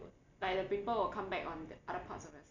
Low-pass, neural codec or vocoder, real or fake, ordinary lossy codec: 7.2 kHz; none; real; none